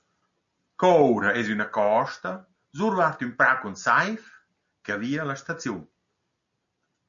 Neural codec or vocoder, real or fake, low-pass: none; real; 7.2 kHz